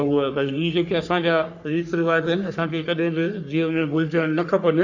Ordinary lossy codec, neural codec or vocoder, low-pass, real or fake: none; codec, 44.1 kHz, 3.4 kbps, Pupu-Codec; 7.2 kHz; fake